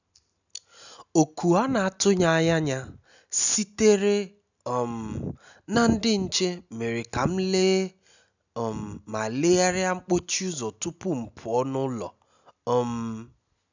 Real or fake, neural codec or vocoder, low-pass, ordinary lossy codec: real; none; 7.2 kHz; none